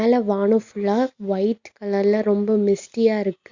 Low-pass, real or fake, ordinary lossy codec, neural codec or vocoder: 7.2 kHz; real; Opus, 64 kbps; none